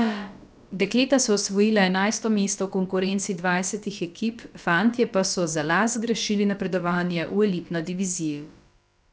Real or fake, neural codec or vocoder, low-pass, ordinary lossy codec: fake; codec, 16 kHz, about 1 kbps, DyCAST, with the encoder's durations; none; none